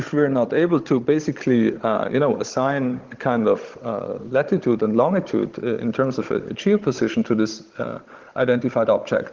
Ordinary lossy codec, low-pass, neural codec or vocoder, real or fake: Opus, 16 kbps; 7.2 kHz; codec, 16 kHz, 16 kbps, FunCodec, trained on Chinese and English, 50 frames a second; fake